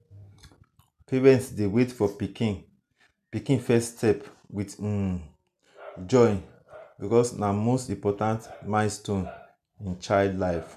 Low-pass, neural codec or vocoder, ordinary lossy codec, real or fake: 14.4 kHz; none; none; real